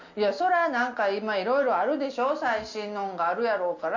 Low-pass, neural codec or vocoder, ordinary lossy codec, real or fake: 7.2 kHz; none; none; real